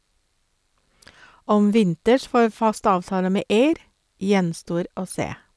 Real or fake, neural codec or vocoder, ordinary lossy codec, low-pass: real; none; none; none